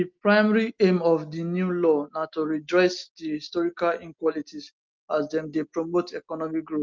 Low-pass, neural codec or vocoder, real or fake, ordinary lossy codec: 7.2 kHz; none; real; Opus, 32 kbps